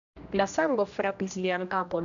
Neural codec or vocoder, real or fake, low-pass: codec, 16 kHz, 1 kbps, X-Codec, HuBERT features, trained on general audio; fake; 7.2 kHz